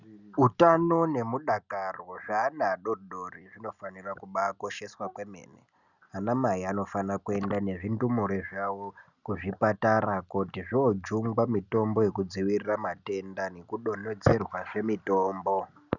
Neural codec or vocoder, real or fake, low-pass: none; real; 7.2 kHz